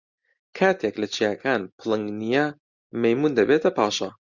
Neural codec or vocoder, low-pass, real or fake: none; 7.2 kHz; real